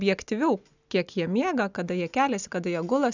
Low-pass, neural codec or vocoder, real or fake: 7.2 kHz; none; real